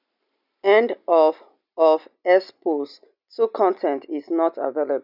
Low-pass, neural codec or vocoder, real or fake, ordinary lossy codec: 5.4 kHz; none; real; none